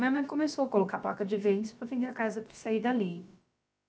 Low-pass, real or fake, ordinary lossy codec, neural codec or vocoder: none; fake; none; codec, 16 kHz, about 1 kbps, DyCAST, with the encoder's durations